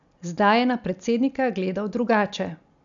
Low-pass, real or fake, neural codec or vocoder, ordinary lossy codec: 7.2 kHz; real; none; none